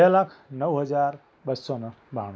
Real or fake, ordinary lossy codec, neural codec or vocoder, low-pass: real; none; none; none